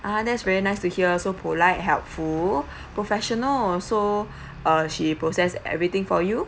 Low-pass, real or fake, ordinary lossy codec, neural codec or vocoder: none; real; none; none